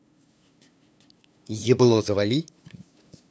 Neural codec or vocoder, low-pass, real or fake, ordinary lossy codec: codec, 16 kHz, 2 kbps, FunCodec, trained on LibriTTS, 25 frames a second; none; fake; none